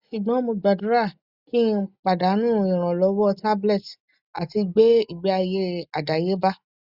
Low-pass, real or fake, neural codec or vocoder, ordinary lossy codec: 5.4 kHz; real; none; Opus, 64 kbps